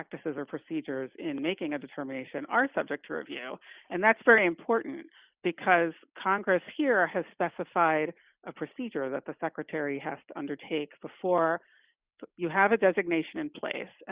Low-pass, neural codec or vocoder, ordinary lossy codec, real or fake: 3.6 kHz; none; Opus, 64 kbps; real